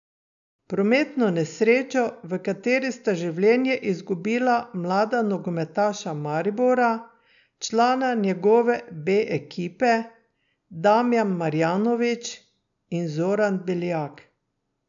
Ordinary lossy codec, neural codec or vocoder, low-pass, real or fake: none; none; 7.2 kHz; real